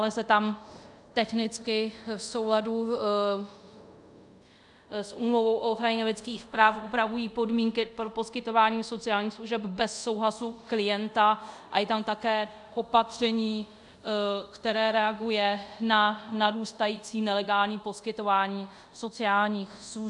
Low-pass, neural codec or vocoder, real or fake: 10.8 kHz; codec, 24 kHz, 0.5 kbps, DualCodec; fake